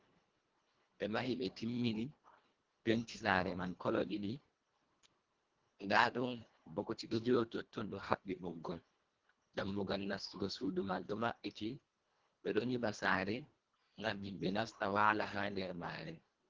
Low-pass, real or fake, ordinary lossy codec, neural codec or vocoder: 7.2 kHz; fake; Opus, 16 kbps; codec, 24 kHz, 1.5 kbps, HILCodec